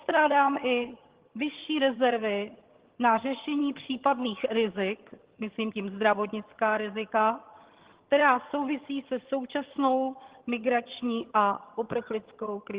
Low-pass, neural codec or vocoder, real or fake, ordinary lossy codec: 3.6 kHz; vocoder, 22.05 kHz, 80 mel bands, HiFi-GAN; fake; Opus, 16 kbps